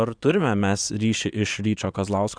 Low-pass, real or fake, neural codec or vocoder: 9.9 kHz; fake; vocoder, 22.05 kHz, 80 mel bands, Vocos